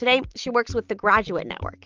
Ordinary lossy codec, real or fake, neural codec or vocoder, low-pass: Opus, 24 kbps; real; none; 7.2 kHz